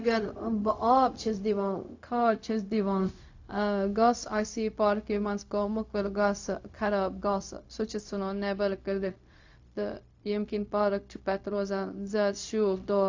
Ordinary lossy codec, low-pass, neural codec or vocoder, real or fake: AAC, 48 kbps; 7.2 kHz; codec, 16 kHz, 0.4 kbps, LongCat-Audio-Codec; fake